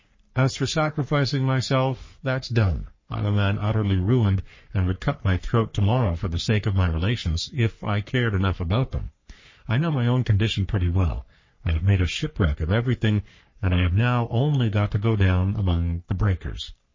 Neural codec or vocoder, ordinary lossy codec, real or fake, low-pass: codec, 44.1 kHz, 3.4 kbps, Pupu-Codec; MP3, 32 kbps; fake; 7.2 kHz